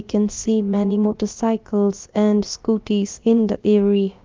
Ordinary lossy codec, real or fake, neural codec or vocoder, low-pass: Opus, 32 kbps; fake; codec, 16 kHz, about 1 kbps, DyCAST, with the encoder's durations; 7.2 kHz